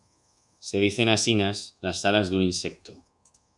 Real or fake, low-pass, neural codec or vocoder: fake; 10.8 kHz; codec, 24 kHz, 1.2 kbps, DualCodec